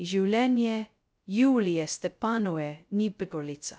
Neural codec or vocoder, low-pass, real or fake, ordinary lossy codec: codec, 16 kHz, 0.2 kbps, FocalCodec; none; fake; none